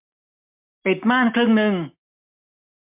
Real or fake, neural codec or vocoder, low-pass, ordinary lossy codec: real; none; 3.6 kHz; MP3, 32 kbps